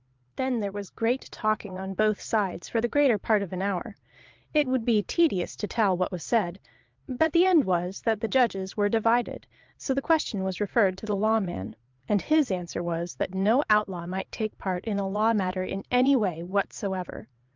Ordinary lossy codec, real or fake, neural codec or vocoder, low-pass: Opus, 24 kbps; fake; vocoder, 22.05 kHz, 80 mel bands, Vocos; 7.2 kHz